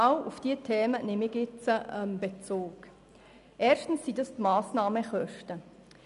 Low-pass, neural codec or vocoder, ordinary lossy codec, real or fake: 10.8 kHz; none; none; real